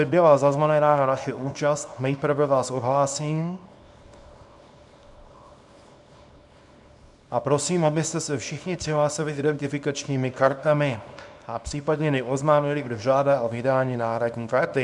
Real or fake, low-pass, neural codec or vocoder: fake; 10.8 kHz; codec, 24 kHz, 0.9 kbps, WavTokenizer, small release